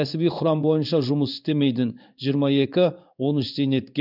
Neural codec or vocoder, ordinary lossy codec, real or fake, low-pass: codec, 16 kHz in and 24 kHz out, 1 kbps, XY-Tokenizer; none; fake; 5.4 kHz